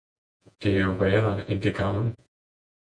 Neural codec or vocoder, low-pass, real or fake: vocoder, 48 kHz, 128 mel bands, Vocos; 9.9 kHz; fake